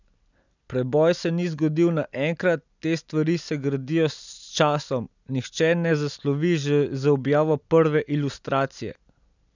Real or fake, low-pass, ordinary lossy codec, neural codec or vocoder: real; 7.2 kHz; none; none